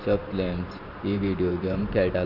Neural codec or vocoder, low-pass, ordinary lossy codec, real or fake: vocoder, 44.1 kHz, 128 mel bands every 512 samples, BigVGAN v2; 5.4 kHz; none; fake